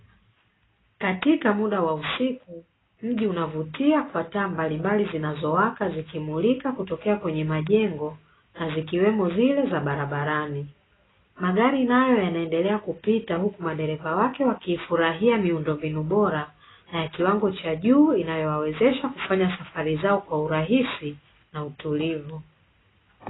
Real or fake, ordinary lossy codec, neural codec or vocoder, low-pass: real; AAC, 16 kbps; none; 7.2 kHz